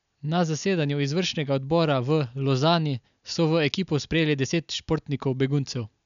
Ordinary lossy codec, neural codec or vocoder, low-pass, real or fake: none; none; 7.2 kHz; real